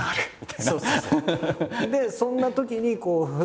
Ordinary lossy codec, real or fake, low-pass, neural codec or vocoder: none; real; none; none